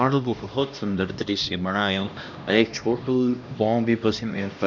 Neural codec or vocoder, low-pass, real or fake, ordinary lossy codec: codec, 16 kHz, 1 kbps, X-Codec, HuBERT features, trained on LibriSpeech; 7.2 kHz; fake; none